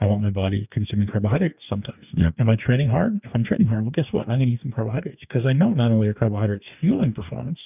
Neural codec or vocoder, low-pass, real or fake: codec, 44.1 kHz, 2.6 kbps, DAC; 3.6 kHz; fake